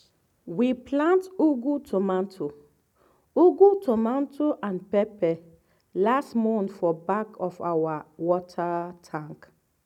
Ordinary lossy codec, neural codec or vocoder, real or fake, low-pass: none; none; real; 19.8 kHz